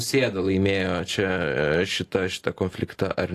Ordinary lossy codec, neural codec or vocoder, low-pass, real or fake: AAC, 48 kbps; none; 14.4 kHz; real